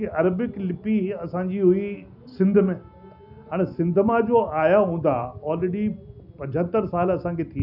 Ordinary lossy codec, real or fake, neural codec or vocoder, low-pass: none; real; none; 5.4 kHz